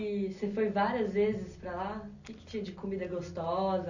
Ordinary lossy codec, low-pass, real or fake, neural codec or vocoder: none; 7.2 kHz; real; none